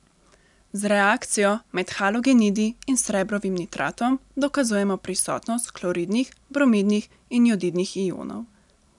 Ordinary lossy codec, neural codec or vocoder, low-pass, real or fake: none; none; 10.8 kHz; real